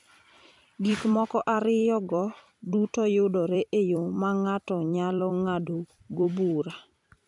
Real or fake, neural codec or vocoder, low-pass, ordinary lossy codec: fake; vocoder, 44.1 kHz, 128 mel bands every 256 samples, BigVGAN v2; 10.8 kHz; none